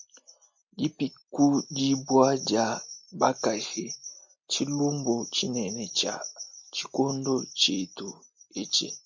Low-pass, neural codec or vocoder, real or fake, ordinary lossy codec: 7.2 kHz; none; real; MP3, 64 kbps